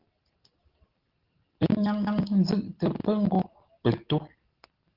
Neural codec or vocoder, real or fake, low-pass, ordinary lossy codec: none; real; 5.4 kHz; Opus, 16 kbps